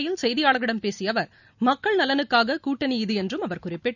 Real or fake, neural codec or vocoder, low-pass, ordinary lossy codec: real; none; 7.2 kHz; none